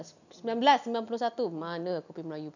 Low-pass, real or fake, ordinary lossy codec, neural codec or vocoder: 7.2 kHz; real; none; none